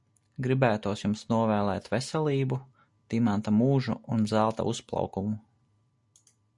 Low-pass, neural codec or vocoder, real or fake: 10.8 kHz; none; real